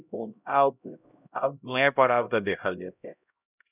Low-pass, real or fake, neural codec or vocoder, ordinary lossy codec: 3.6 kHz; fake; codec, 16 kHz, 0.5 kbps, X-Codec, HuBERT features, trained on LibriSpeech; none